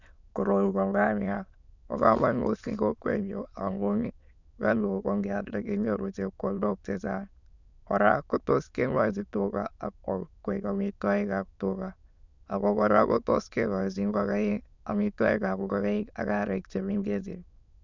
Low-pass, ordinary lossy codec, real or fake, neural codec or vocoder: 7.2 kHz; none; fake; autoencoder, 22.05 kHz, a latent of 192 numbers a frame, VITS, trained on many speakers